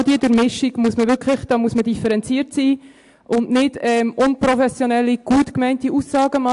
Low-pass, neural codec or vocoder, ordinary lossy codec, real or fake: 10.8 kHz; none; AAC, 64 kbps; real